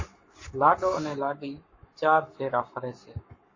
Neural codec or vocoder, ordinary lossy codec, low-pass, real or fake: codec, 44.1 kHz, 7.8 kbps, Pupu-Codec; MP3, 32 kbps; 7.2 kHz; fake